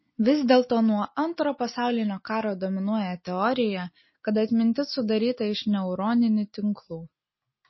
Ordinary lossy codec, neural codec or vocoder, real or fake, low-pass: MP3, 24 kbps; none; real; 7.2 kHz